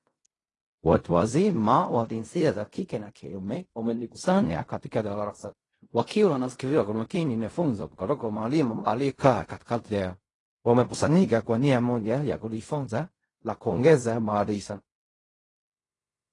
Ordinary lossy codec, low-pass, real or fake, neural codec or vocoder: AAC, 32 kbps; 10.8 kHz; fake; codec, 16 kHz in and 24 kHz out, 0.4 kbps, LongCat-Audio-Codec, fine tuned four codebook decoder